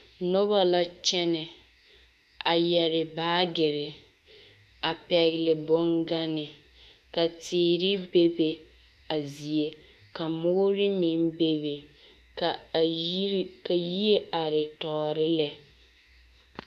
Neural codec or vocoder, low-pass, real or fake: autoencoder, 48 kHz, 32 numbers a frame, DAC-VAE, trained on Japanese speech; 14.4 kHz; fake